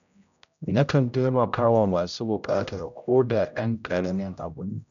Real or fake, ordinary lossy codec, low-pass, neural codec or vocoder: fake; none; 7.2 kHz; codec, 16 kHz, 0.5 kbps, X-Codec, HuBERT features, trained on general audio